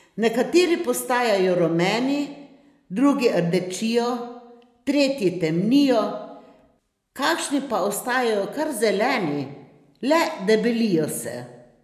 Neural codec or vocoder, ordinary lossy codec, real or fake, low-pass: none; none; real; 14.4 kHz